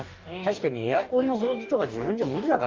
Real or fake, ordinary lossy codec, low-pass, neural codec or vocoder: fake; Opus, 32 kbps; 7.2 kHz; codec, 44.1 kHz, 2.6 kbps, DAC